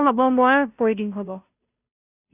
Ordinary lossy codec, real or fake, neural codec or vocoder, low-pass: none; fake; codec, 16 kHz, 0.5 kbps, FunCodec, trained on Chinese and English, 25 frames a second; 3.6 kHz